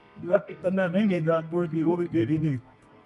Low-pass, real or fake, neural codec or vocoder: 10.8 kHz; fake; codec, 24 kHz, 0.9 kbps, WavTokenizer, medium music audio release